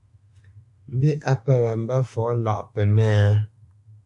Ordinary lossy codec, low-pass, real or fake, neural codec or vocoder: AAC, 64 kbps; 10.8 kHz; fake; autoencoder, 48 kHz, 32 numbers a frame, DAC-VAE, trained on Japanese speech